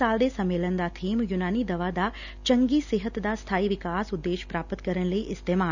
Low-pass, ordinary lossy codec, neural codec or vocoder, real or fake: 7.2 kHz; none; none; real